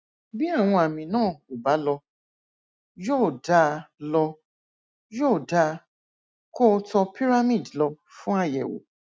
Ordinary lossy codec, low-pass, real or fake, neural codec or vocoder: none; none; real; none